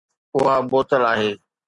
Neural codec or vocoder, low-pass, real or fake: none; 10.8 kHz; real